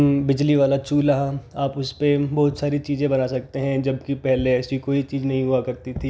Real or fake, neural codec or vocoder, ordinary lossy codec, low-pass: real; none; none; none